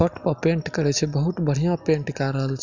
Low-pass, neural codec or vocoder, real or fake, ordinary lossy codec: 7.2 kHz; none; real; Opus, 64 kbps